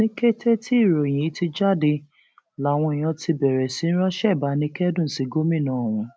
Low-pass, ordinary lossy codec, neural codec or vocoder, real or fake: none; none; none; real